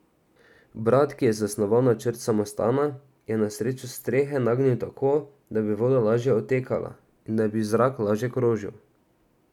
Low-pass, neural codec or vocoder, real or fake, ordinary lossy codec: 19.8 kHz; none; real; none